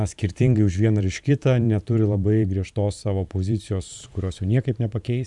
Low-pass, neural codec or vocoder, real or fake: 10.8 kHz; vocoder, 44.1 kHz, 128 mel bands every 512 samples, BigVGAN v2; fake